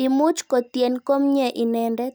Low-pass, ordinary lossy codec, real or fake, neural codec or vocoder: none; none; real; none